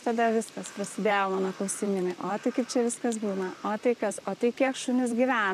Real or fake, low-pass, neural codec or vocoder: fake; 14.4 kHz; vocoder, 44.1 kHz, 128 mel bands, Pupu-Vocoder